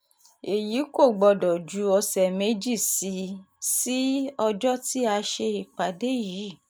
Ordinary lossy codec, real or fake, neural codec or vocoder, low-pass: none; real; none; none